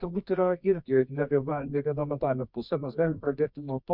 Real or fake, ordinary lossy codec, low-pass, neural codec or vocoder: fake; MP3, 48 kbps; 5.4 kHz; codec, 24 kHz, 0.9 kbps, WavTokenizer, medium music audio release